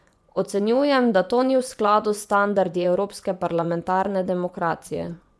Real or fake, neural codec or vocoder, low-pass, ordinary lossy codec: fake; vocoder, 24 kHz, 100 mel bands, Vocos; none; none